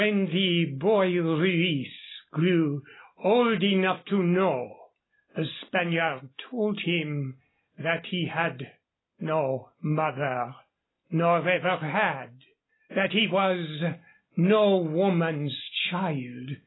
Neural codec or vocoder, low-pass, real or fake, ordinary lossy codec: none; 7.2 kHz; real; AAC, 16 kbps